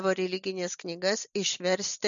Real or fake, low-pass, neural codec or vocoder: real; 7.2 kHz; none